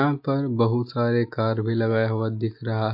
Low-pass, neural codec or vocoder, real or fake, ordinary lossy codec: 5.4 kHz; none; real; MP3, 32 kbps